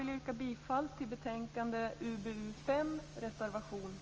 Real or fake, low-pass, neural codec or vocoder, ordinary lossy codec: real; 7.2 kHz; none; Opus, 24 kbps